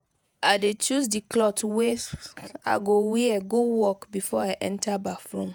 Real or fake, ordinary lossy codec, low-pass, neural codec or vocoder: fake; none; none; vocoder, 48 kHz, 128 mel bands, Vocos